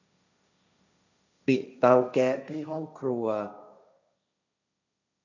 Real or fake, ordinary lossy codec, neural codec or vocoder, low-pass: fake; none; codec, 16 kHz, 1.1 kbps, Voila-Tokenizer; 7.2 kHz